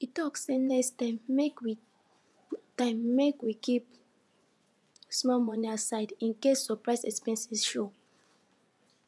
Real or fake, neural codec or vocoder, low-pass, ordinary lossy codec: fake; vocoder, 24 kHz, 100 mel bands, Vocos; none; none